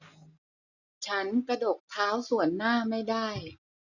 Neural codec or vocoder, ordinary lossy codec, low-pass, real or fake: none; none; 7.2 kHz; real